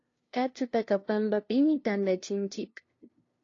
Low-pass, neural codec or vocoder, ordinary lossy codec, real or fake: 7.2 kHz; codec, 16 kHz, 0.5 kbps, FunCodec, trained on LibriTTS, 25 frames a second; AAC, 32 kbps; fake